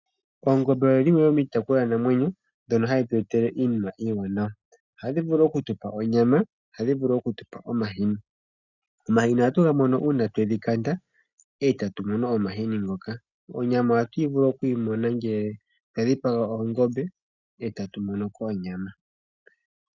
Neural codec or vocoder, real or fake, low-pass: none; real; 7.2 kHz